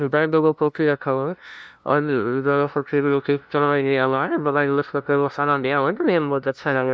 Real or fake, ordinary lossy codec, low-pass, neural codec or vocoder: fake; none; none; codec, 16 kHz, 0.5 kbps, FunCodec, trained on LibriTTS, 25 frames a second